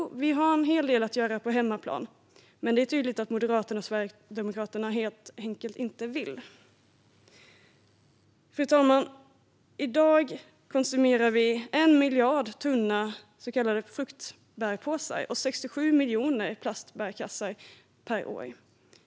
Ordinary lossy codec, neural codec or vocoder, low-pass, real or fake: none; none; none; real